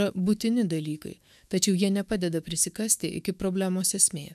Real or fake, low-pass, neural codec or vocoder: fake; 14.4 kHz; autoencoder, 48 kHz, 128 numbers a frame, DAC-VAE, trained on Japanese speech